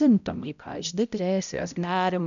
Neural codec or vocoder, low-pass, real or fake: codec, 16 kHz, 0.5 kbps, X-Codec, HuBERT features, trained on balanced general audio; 7.2 kHz; fake